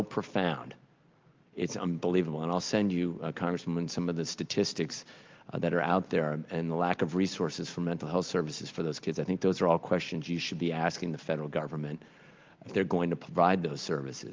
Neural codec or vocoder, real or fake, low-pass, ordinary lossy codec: none; real; 7.2 kHz; Opus, 32 kbps